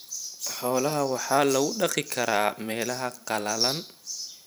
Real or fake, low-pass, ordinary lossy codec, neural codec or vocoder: fake; none; none; vocoder, 44.1 kHz, 128 mel bands every 512 samples, BigVGAN v2